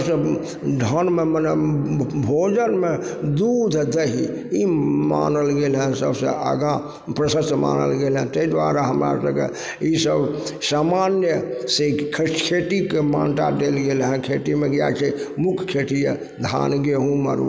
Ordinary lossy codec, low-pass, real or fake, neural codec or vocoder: none; none; real; none